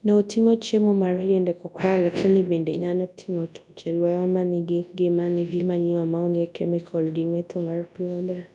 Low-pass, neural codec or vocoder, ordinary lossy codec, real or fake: 10.8 kHz; codec, 24 kHz, 0.9 kbps, WavTokenizer, large speech release; none; fake